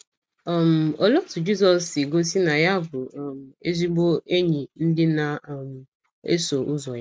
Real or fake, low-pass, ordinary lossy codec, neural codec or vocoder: real; none; none; none